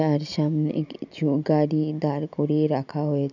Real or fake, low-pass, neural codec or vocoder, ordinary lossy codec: real; 7.2 kHz; none; none